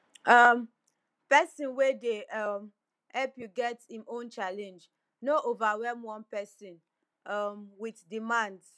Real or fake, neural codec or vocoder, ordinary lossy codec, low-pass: real; none; none; none